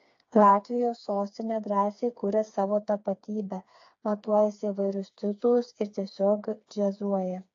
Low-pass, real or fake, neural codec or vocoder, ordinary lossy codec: 7.2 kHz; fake; codec, 16 kHz, 4 kbps, FreqCodec, smaller model; AAC, 48 kbps